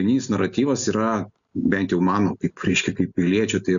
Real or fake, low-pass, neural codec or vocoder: real; 7.2 kHz; none